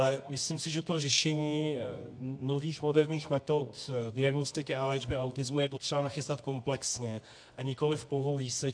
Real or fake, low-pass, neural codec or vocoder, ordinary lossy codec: fake; 9.9 kHz; codec, 24 kHz, 0.9 kbps, WavTokenizer, medium music audio release; AAC, 64 kbps